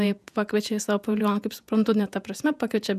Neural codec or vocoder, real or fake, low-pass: vocoder, 48 kHz, 128 mel bands, Vocos; fake; 14.4 kHz